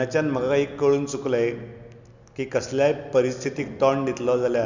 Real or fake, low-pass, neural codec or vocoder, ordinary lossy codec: real; 7.2 kHz; none; none